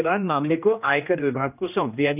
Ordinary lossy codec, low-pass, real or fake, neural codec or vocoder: none; 3.6 kHz; fake; codec, 16 kHz, 1 kbps, X-Codec, HuBERT features, trained on general audio